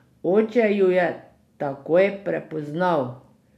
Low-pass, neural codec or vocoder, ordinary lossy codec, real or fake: 14.4 kHz; none; none; real